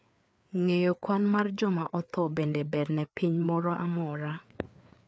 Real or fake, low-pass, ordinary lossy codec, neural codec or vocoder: fake; none; none; codec, 16 kHz, 4 kbps, FreqCodec, larger model